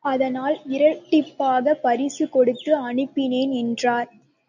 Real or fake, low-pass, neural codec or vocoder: real; 7.2 kHz; none